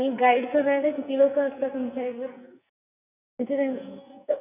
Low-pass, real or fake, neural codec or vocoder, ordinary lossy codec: 3.6 kHz; fake; codec, 44.1 kHz, 2.6 kbps, SNAC; none